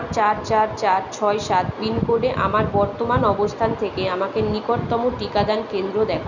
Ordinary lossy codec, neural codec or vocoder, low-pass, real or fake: none; none; 7.2 kHz; real